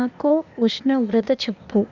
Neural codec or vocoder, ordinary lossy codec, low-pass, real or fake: codec, 16 kHz, 0.8 kbps, ZipCodec; none; 7.2 kHz; fake